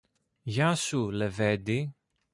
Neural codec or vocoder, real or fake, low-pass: none; real; 10.8 kHz